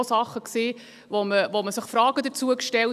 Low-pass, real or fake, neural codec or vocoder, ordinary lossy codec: 14.4 kHz; real; none; none